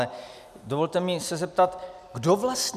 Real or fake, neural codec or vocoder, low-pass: real; none; 14.4 kHz